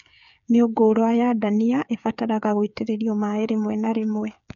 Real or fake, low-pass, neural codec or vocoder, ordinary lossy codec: fake; 7.2 kHz; codec, 16 kHz, 8 kbps, FreqCodec, smaller model; none